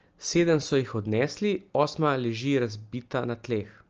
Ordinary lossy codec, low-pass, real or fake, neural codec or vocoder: Opus, 24 kbps; 7.2 kHz; real; none